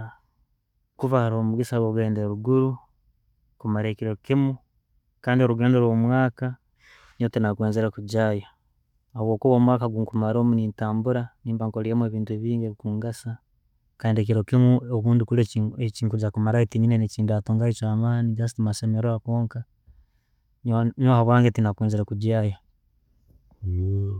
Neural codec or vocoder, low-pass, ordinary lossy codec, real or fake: none; 19.8 kHz; none; real